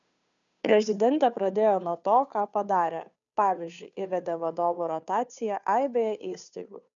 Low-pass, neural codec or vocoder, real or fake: 7.2 kHz; codec, 16 kHz, 2 kbps, FunCodec, trained on Chinese and English, 25 frames a second; fake